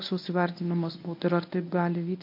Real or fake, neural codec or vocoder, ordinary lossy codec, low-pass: fake; codec, 24 kHz, 0.9 kbps, WavTokenizer, medium speech release version 1; MP3, 32 kbps; 5.4 kHz